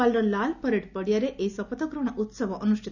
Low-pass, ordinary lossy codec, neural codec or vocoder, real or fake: 7.2 kHz; none; none; real